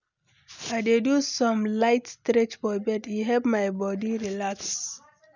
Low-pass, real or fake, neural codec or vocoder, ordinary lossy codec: 7.2 kHz; real; none; none